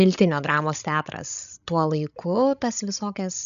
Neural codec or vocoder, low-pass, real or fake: codec, 16 kHz, 16 kbps, FreqCodec, larger model; 7.2 kHz; fake